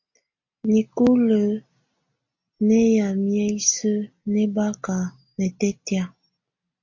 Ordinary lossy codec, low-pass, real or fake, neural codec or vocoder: MP3, 48 kbps; 7.2 kHz; real; none